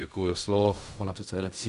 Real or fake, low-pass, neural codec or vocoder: fake; 10.8 kHz; codec, 16 kHz in and 24 kHz out, 0.4 kbps, LongCat-Audio-Codec, fine tuned four codebook decoder